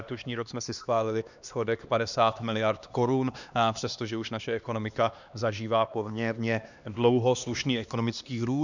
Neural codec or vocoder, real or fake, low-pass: codec, 16 kHz, 2 kbps, X-Codec, HuBERT features, trained on LibriSpeech; fake; 7.2 kHz